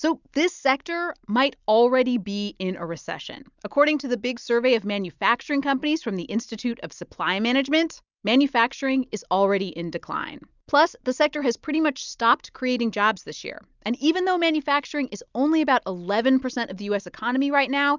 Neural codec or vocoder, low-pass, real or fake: none; 7.2 kHz; real